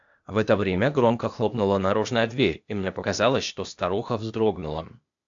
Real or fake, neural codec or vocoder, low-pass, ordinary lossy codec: fake; codec, 16 kHz, 0.8 kbps, ZipCodec; 7.2 kHz; AAC, 64 kbps